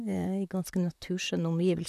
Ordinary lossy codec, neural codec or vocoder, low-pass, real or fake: none; vocoder, 44.1 kHz, 128 mel bands, Pupu-Vocoder; 14.4 kHz; fake